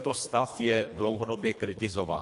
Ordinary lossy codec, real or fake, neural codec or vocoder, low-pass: AAC, 64 kbps; fake; codec, 24 kHz, 1.5 kbps, HILCodec; 10.8 kHz